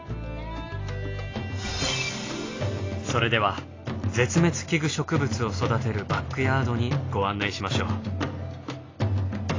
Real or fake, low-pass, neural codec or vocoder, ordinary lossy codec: real; 7.2 kHz; none; AAC, 32 kbps